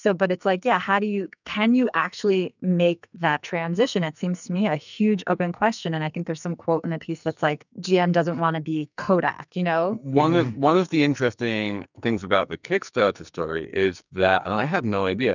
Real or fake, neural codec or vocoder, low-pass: fake; codec, 44.1 kHz, 2.6 kbps, SNAC; 7.2 kHz